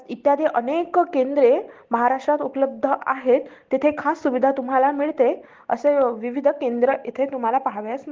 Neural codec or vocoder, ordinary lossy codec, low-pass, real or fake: none; Opus, 16 kbps; 7.2 kHz; real